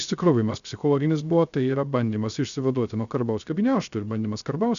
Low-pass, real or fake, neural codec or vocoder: 7.2 kHz; fake; codec, 16 kHz, 0.3 kbps, FocalCodec